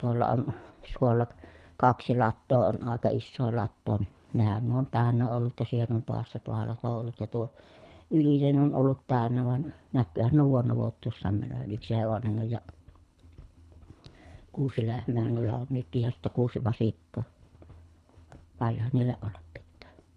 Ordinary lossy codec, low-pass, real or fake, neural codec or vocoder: none; none; fake; codec, 24 kHz, 3 kbps, HILCodec